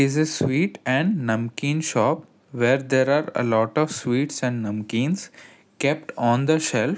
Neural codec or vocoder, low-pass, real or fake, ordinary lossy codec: none; none; real; none